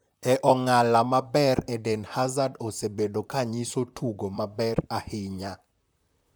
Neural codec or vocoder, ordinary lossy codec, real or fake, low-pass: vocoder, 44.1 kHz, 128 mel bands, Pupu-Vocoder; none; fake; none